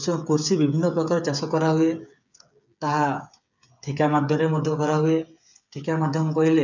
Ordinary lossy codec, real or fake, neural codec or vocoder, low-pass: none; fake; codec, 16 kHz, 8 kbps, FreqCodec, smaller model; 7.2 kHz